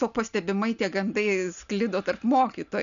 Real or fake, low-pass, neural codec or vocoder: real; 7.2 kHz; none